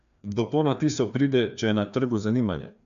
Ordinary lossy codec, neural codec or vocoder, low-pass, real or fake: none; codec, 16 kHz, 2 kbps, FreqCodec, larger model; 7.2 kHz; fake